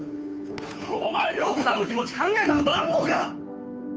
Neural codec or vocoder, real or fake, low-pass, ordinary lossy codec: codec, 16 kHz, 2 kbps, FunCodec, trained on Chinese and English, 25 frames a second; fake; none; none